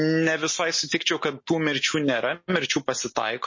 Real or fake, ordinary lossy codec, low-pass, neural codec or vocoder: real; MP3, 32 kbps; 7.2 kHz; none